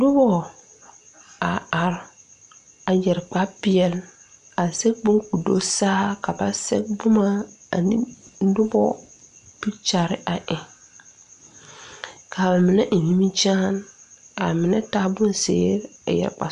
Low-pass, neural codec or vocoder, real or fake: 10.8 kHz; none; real